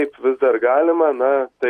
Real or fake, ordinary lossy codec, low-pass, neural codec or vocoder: real; AAC, 64 kbps; 14.4 kHz; none